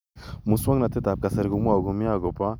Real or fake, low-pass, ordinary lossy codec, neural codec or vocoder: real; none; none; none